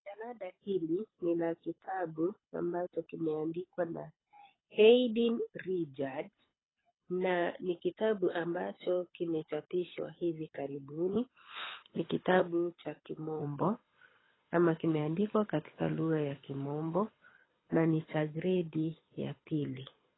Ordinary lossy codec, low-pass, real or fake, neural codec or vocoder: AAC, 16 kbps; 7.2 kHz; fake; codec, 24 kHz, 6 kbps, HILCodec